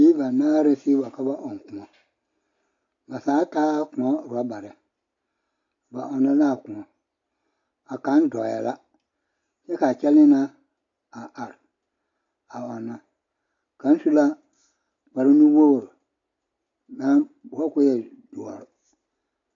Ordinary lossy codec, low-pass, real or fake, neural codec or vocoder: AAC, 48 kbps; 7.2 kHz; real; none